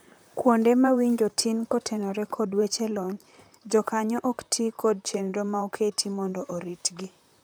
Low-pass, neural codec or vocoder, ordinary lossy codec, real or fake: none; vocoder, 44.1 kHz, 128 mel bands, Pupu-Vocoder; none; fake